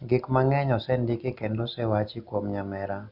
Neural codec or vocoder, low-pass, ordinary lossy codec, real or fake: none; 5.4 kHz; Opus, 64 kbps; real